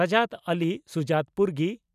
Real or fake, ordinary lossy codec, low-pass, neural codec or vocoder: real; none; 14.4 kHz; none